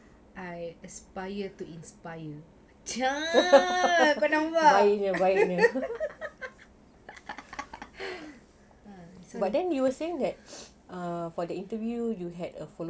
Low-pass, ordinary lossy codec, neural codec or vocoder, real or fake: none; none; none; real